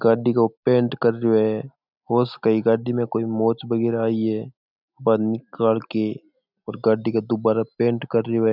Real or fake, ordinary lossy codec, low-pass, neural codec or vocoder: real; none; 5.4 kHz; none